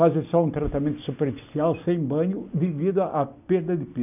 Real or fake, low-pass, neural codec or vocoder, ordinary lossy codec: real; 3.6 kHz; none; none